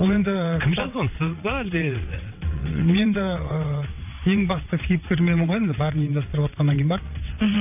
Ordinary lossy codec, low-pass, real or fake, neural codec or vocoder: none; 3.6 kHz; fake; vocoder, 22.05 kHz, 80 mel bands, WaveNeXt